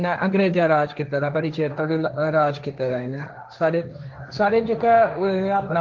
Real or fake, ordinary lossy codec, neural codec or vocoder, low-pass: fake; Opus, 32 kbps; codec, 16 kHz, 1.1 kbps, Voila-Tokenizer; 7.2 kHz